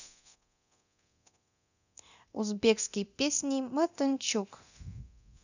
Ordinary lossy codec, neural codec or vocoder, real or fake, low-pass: none; codec, 24 kHz, 0.9 kbps, DualCodec; fake; 7.2 kHz